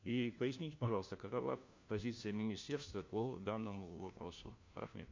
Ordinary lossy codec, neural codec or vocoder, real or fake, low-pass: MP3, 48 kbps; codec, 16 kHz, 1 kbps, FunCodec, trained on LibriTTS, 50 frames a second; fake; 7.2 kHz